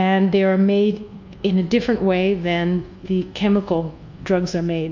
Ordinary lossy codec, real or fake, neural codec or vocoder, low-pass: MP3, 48 kbps; fake; codec, 24 kHz, 1.2 kbps, DualCodec; 7.2 kHz